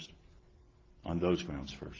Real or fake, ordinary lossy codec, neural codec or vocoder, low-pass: real; Opus, 16 kbps; none; 7.2 kHz